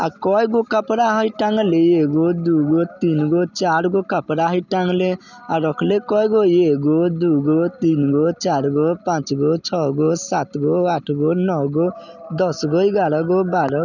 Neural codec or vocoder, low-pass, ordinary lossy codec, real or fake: none; 7.2 kHz; none; real